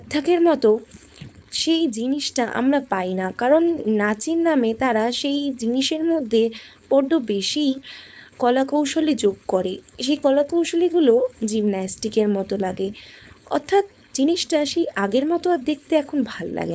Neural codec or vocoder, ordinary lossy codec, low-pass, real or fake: codec, 16 kHz, 4.8 kbps, FACodec; none; none; fake